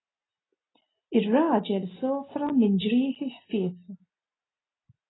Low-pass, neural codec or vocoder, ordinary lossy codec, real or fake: 7.2 kHz; none; AAC, 16 kbps; real